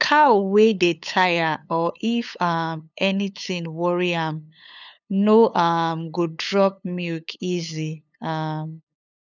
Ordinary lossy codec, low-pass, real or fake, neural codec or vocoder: none; 7.2 kHz; fake; codec, 16 kHz, 8 kbps, FunCodec, trained on LibriTTS, 25 frames a second